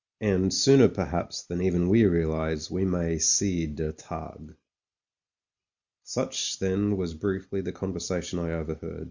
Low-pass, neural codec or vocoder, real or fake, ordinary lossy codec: 7.2 kHz; none; real; Opus, 64 kbps